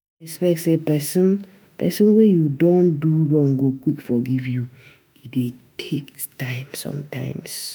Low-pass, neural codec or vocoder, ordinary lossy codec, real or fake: none; autoencoder, 48 kHz, 32 numbers a frame, DAC-VAE, trained on Japanese speech; none; fake